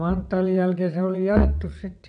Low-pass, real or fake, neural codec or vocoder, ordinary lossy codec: 9.9 kHz; fake; vocoder, 22.05 kHz, 80 mel bands, WaveNeXt; none